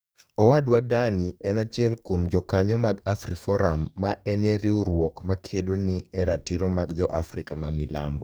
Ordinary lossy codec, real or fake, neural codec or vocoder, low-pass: none; fake; codec, 44.1 kHz, 2.6 kbps, DAC; none